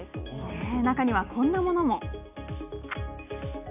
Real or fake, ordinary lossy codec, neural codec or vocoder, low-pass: real; none; none; 3.6 kHz